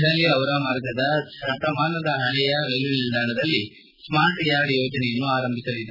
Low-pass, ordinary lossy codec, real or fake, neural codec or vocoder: 5.4 kHz; none; real; none